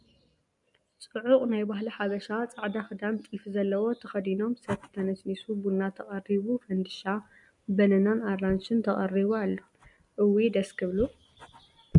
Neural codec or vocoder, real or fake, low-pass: none; real; 10.8 kHz